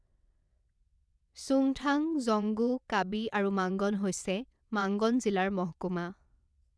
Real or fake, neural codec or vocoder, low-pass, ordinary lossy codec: fake; vocoder, 22.05 kHz, 80 mel bands, WaveNeXt; none; none